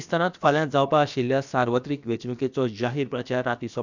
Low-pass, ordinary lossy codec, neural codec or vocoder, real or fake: 7.2 kHz; none; codec, 16 kHz, about 1 kbps, DyCAST, with the encoder's durations; fake